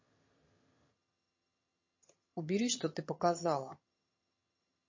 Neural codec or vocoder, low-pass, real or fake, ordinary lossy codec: vocoder, 22.05 kHz, 80 mel bands, HiFi-GAN; 7.2 kHz; fake; MP3, 32 kbps